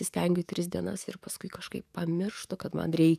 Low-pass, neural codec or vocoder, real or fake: 14.4 kHz; codec, 44.1 kHz, 7.8 kbps, DAC; fake